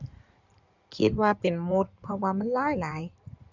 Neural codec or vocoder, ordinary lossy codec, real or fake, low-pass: codec, 16 kHz in and 24 kHz out, 2.2 kbps, FireRedTTS-2 codec; none; fake; 7.2 kHz